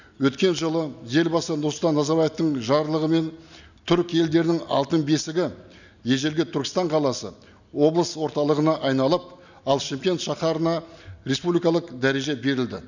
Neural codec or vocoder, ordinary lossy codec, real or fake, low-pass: none; none; real; 7.2 kHz